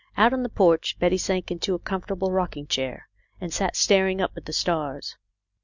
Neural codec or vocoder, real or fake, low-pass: none; real; 7.2 kHz